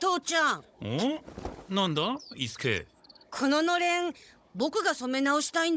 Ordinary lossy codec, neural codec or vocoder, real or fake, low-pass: none; codec, 16 kHz, 16 kbps, FunCodec, trained on Chinese and English, 50 frames a second; fake; none